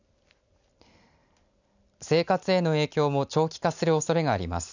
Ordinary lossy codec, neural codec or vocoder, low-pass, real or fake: none; none; 7.2 kHz; real